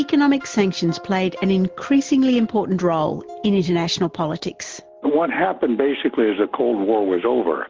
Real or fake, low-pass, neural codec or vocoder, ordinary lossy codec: real; 7.2 kHz; none; Opus, 16 kbps